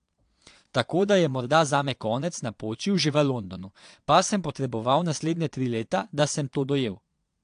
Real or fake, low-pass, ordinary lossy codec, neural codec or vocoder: fake; 9.9 kHz; AAC, 64 kbps; vocoder, 22.05 kHz, 80 mel bands, Vocos